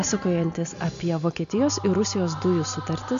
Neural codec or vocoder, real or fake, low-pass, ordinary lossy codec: none; real; 7.2 kHz; AAC, 96 kbps